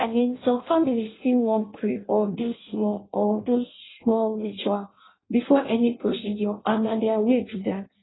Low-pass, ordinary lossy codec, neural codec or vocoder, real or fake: 7.2 kHz; AAC, 16 kbps; codec, 16 kHz in and 24 kHz out, 0.6 kbps, FireRedTTS-2 codec; fake